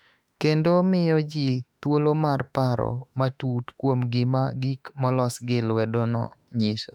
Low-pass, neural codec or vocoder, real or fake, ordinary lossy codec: 19.8 kHz; autoencoder, 48 kHz, 32 numbers a frame, DAC-VAE, trained on Japanese speech; fake; none